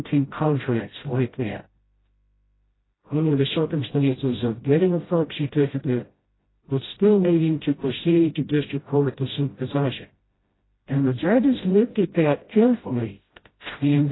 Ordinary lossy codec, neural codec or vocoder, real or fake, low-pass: AAC, 16 kbps; codec, 16 kHz, 0.5 kbps, FreqCodec, smaller model; fake; 7.2 kHz